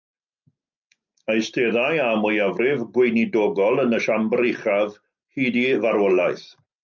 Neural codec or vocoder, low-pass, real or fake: none; 7.2 kHz; real